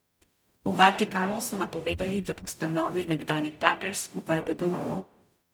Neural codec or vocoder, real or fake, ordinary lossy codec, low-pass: codec, 44.1 kHz, 0.9 kbps, DAC; fake; none; none